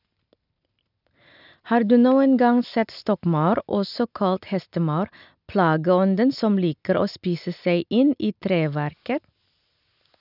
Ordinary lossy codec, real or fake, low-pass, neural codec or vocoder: none; real; 5.4 kHz; none